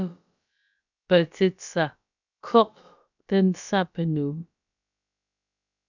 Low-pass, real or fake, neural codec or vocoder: 7.2 kHz; fake; codec, 16 kHz, about 1 kbps, DyCAST, with the encoder's durations